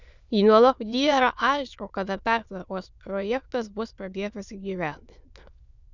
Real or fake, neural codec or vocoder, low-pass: fake; autoencoder, 22.05 kHz, a latent of 192 numbers a frame, VITS, trained on many speakers; 7.2 kHz